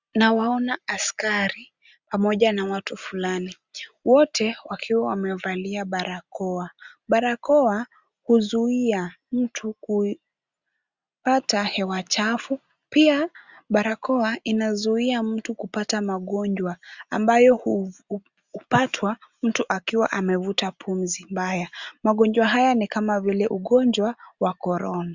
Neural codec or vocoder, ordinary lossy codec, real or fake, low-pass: none; Opus, 64 kbps; real; 7.2 kHz